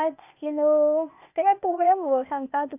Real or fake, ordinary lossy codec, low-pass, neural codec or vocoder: fake; none; 3.6 kHz; codec, 16 kHz, 0.8 kbps, ZipCodec